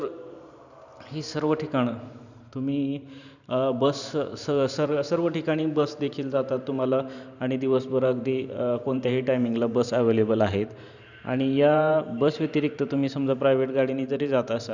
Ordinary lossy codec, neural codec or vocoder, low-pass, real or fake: none; vocoder, 44.1 kHz, 128 mel bands every 512 samples, BigVGAN v2; 7.2 kHz; fake